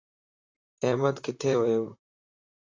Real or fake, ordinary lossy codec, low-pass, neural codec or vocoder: fake; AAC, 48 kbps; 7.2 kHz; vocoder, 44.1 kHz, 128 mel bands, Pupu-Vocoder